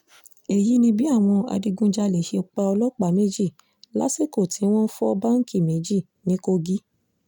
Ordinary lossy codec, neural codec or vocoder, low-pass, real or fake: none; none; 19.8 kHz; real